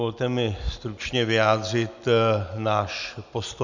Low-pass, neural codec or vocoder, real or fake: 7.2 kHz; none; real